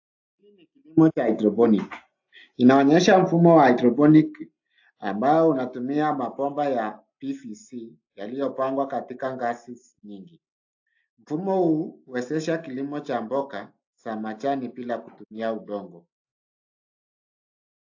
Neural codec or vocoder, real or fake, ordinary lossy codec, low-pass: none; real; AAC, 48 kbps; 7.2 kHz